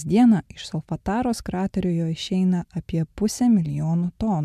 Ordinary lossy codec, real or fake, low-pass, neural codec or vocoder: MP3, 96 kbps; real; 14.4 kHz; none